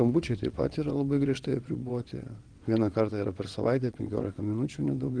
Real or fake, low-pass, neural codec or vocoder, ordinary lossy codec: fake; 9.9 kHz; vocoder, 22.05 kHz, 80 mel bands, WaveNeXt; Opus, 24 kbps